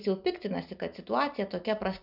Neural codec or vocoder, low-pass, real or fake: none; 5.4 kHz; real